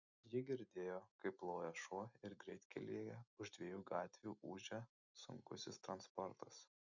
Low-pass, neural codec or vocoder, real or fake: 7.2 kHz; none; real